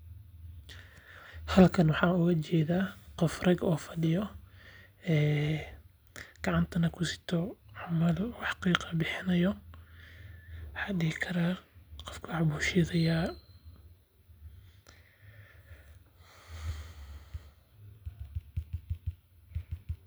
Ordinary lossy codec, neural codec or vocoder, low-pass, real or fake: none; none; none; real